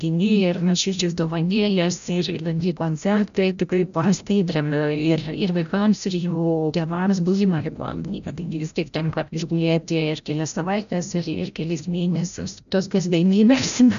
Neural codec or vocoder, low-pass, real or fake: codec, 16 kHz, 0.5 kbps, FreqCodec, larger model; 7.2 kHz; fake